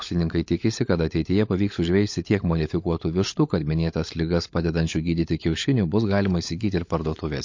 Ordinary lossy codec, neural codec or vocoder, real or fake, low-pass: MP3, 48 kbps; codec, 16 kHz, 16 kbps, FunCodec, trained on LibriTTS, 50 frames a second; fake; 7.2 kHz